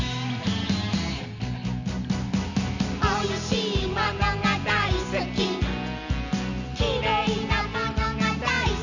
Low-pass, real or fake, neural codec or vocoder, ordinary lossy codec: 7.2 kHz; real; none; none